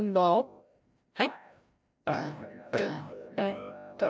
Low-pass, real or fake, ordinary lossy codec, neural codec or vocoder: none; fake; none; codec, 16 kHz, 0.5 kbps, FreqCodec, larger model